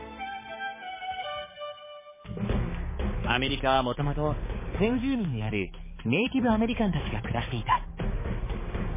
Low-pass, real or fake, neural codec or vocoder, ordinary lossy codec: 3.6 kHz; fake; codec, 16 kHz, 4 kbps, X-Codec, HuBERT features, trained on balanced general audio; MP3, 16 kbps